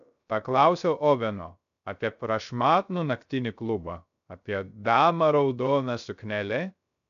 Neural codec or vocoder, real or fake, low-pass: codec, 16 kHz, 0.3 kbps, FocalCodec; fake; 7.2 kHz